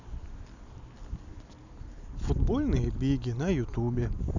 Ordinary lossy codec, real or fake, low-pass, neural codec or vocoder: none; real; 7.2 kHz; none